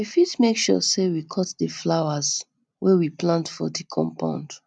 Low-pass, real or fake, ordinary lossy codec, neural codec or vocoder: none; real; none; none